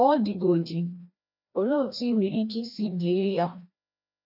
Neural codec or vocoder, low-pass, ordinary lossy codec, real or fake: codec, 16 kHz, 1 kbps, FreqCodec, larger model; 5.4 kHz; none; fake